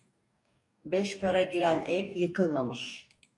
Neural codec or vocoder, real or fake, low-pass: codec, 44.1 kHz, 2.6 kbps, DAC; fake; 10.8 kHz